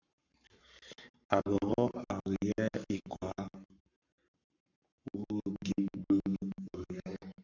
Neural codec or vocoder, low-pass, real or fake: codec, 44.1 kHz, 7.8 kbps, Pupu-Codec; 7.2 kHz; fake